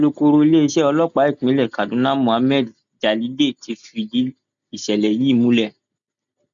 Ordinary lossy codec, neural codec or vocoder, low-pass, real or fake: none; none; 7.2 kHz; real